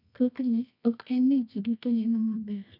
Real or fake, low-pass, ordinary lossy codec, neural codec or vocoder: fake; 5.4 kHz; none; codec, 24 kHz, 0.9 kbps, WavTokenizer, medium music audio release